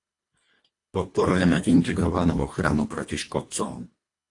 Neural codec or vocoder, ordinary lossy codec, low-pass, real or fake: codec, 24 kHz, 1.5 kbps, HILCodec; AAC, 48 kbps; 10.8 kHz; fake